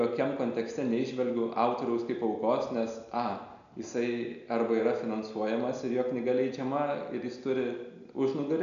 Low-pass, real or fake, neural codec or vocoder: 7.2 kHz; real; none